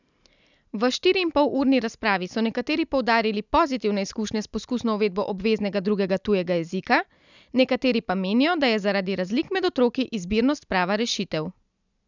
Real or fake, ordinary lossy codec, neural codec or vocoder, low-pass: real; none; none; 7.2 kHz